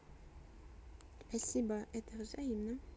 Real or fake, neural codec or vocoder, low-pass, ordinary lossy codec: real; none; none; none